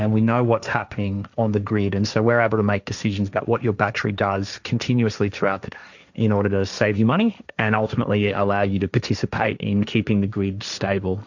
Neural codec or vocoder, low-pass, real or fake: codec, 16 kHz, 1.1 kbps, Voila-Tokenizer; 7.2 kHz; fake